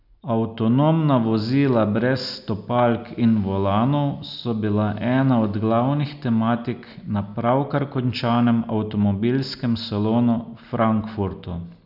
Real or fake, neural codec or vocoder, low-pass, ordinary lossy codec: real; none; 5.4 kHz; none